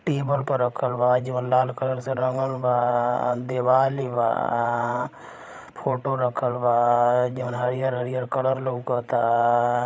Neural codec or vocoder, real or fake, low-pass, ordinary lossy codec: codec, 16 kHz, 8 kbps, FreqCodec, larger model; fake; none; none